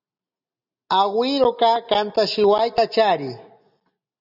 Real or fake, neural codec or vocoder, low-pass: real; none; 5.4 kHz